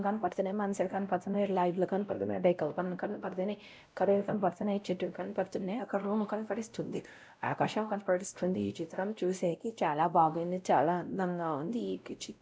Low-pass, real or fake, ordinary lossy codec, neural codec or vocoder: none; fake; none; codec, 16 kHz, 0.5 kbps, X-Codec, WavLM features, trained on Multilingual LibriSpeech